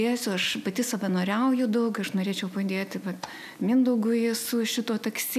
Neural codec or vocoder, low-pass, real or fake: none; 14.4 kHz; real